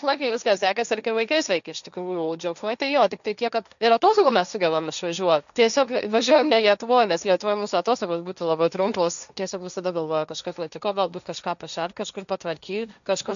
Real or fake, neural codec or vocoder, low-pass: fake; codec, 16 kHz, 1.1 kbps, Voila-Tokenizer; 7.2 kHz